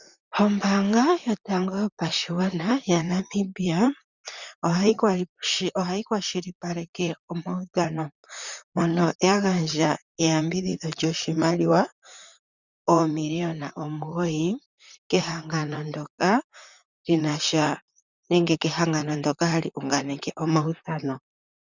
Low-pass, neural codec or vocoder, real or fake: 7.2 kHz; vocoder, 44.1 kHz, 128 mel bands, Pupu-Vocoder; fake